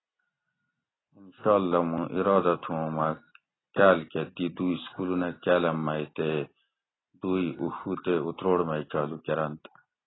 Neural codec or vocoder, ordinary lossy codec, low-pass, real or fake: none; AAC, 16 kbps; 7.2 kHz; real